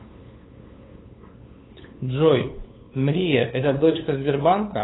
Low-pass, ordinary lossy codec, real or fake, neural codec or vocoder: 7.2 kHz; AAC, 16 kbps; fake; codec, 16 kHz, 8 kbps, FunCodec, trained on LibriTTS, 25 frames a second